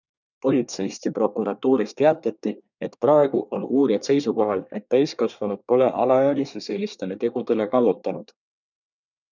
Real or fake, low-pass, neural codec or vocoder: fake; 7.2 kHz; codec, 24 kHz, 1 kbps, SNAC